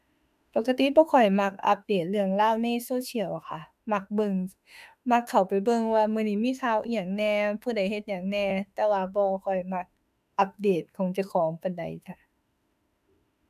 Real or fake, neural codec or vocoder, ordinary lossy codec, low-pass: fake; autoencoder, 48 kHz, 32 numbers a frame, DAC-VAE, trained on Japanese speech; none; 14.4 kHz